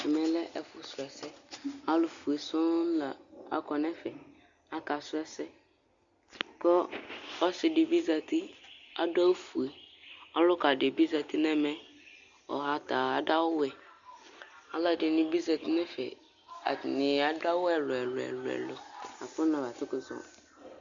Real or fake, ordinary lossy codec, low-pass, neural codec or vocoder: real; Opus, 64 kbps; 7.2 kHz; none